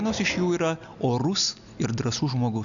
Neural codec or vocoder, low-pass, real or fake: none; 7.2 kHz; real